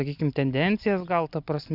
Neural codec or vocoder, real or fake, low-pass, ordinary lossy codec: none; real; 5.4 kHz; Opus, 64 kbps